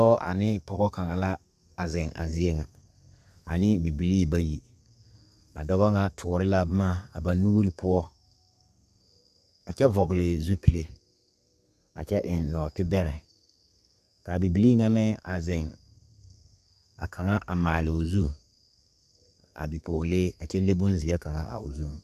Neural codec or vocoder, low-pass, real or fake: codec, 32 kHz, 1.9 kbps, SNAC; 14.4 kHz; fake